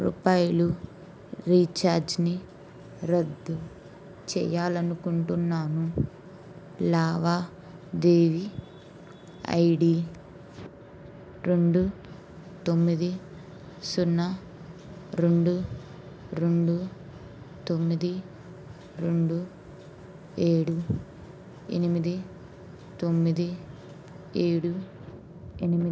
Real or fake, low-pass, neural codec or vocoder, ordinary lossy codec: real; none; none; none